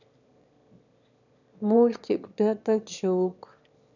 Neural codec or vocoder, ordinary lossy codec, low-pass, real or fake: autoencoder, 22.05 kHz, a latent of 192 numbers a frame, VITS, trained on one speaker; none; 7.2 kHz; fake